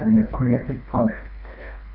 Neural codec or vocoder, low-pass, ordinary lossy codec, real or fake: codec, 24 kHz, 1.5 kbps, HILCodec; 5.4 kHz; none; fake